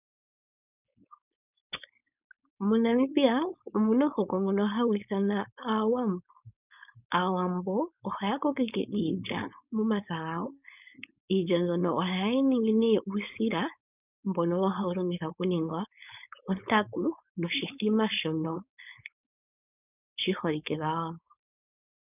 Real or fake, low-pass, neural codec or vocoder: fake; 3.6 kHz; codec, 16 kHz, 4.8 kbps, FACodec